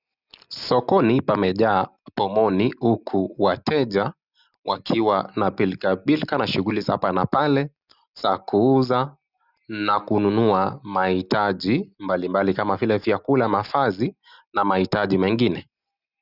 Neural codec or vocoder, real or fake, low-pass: none; real; 5.4 kHz